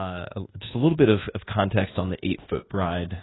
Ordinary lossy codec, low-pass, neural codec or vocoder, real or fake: AAC, 16 kbps; 7.2 kHz; codec, 24 kHz, 1.2 kbps, DualCodec; fake